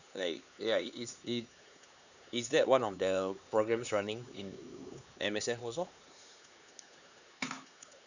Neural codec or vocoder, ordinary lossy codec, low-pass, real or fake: codec, 16 kHz, 4 kbps, X-Codec, HuBERT features, trained on LibriSpeech; none; 7.2 kHz; fake